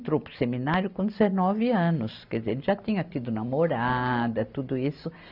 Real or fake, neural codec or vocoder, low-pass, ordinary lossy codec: real; none; 5.4 kHz; none